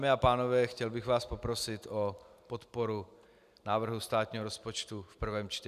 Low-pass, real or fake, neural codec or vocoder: 14.4 kHz; real; none